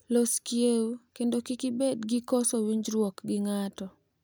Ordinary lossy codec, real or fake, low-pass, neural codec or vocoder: none; real; none; none